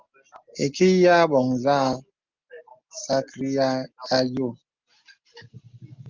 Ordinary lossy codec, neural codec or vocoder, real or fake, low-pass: Opus, 24 kbps; none; real; 7.2 kHz